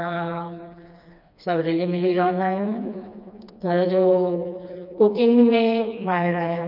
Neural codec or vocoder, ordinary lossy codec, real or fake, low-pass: codec, 16 kHz, 2 kbps, FreqCodec, smaller model; none; fake; 5.4 kHz